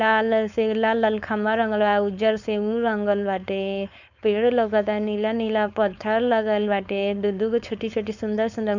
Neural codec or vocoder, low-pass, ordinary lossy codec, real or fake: codec, 16 kHz, 4.8 kbps, FACodec; 7.2 kHz; none; fake